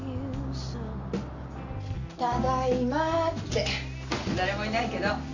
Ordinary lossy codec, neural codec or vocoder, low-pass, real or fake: AAC, 48 kbps; none; 7.2 kHz; real